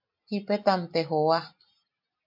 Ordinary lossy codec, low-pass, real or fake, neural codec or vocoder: MP3, 48 kbps; 5.4 kHz; real; none